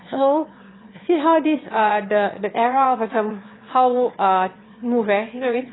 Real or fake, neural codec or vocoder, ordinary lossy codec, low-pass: fake; autoencoder, 22.05 kHz, a latent of 192 numbers a frame, VITS, trained on one speaker; AAC, 16 kbps; 7.2 kHz